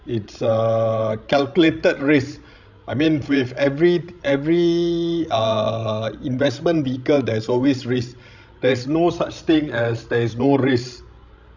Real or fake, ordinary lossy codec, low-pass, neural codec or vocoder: fake; none; 7.2 kHz; codec, 16 kHz, 16 kbps, FreqCodec, larger model